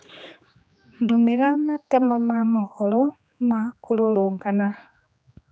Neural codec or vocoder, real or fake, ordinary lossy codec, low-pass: codec, 16 kHz, 2 kbps, X-Codec, HuBERT features, trained on general audio; fake; none; none